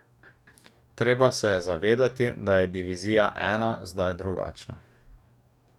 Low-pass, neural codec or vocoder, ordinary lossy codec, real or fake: 19.8 kHz; codec, 44.1 kHz, 2.6 kbps, DAC; none; fake